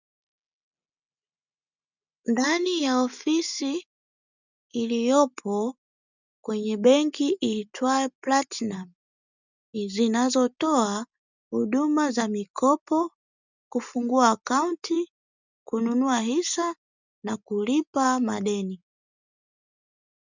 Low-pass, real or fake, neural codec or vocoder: 7.2 kHz; real; none